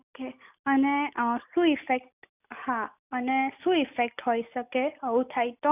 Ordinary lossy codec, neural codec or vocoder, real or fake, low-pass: none; none; real; 3.6 kHz